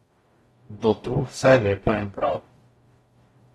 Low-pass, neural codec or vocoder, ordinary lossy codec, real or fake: 19.8 kHz; codec, 44.1 kHz, 0.9 kbps, DAC; AAC, 32 kbps; fake